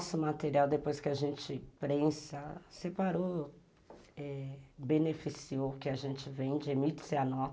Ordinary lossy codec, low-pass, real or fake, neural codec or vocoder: none; none; real; none